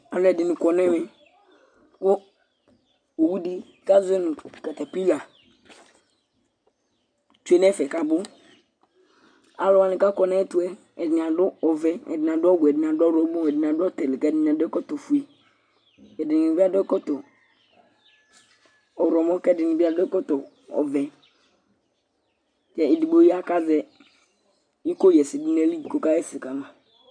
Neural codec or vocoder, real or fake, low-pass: none; real; 9.9 kHz